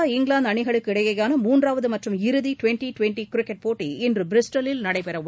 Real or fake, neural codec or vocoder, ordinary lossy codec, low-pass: real; none; none; none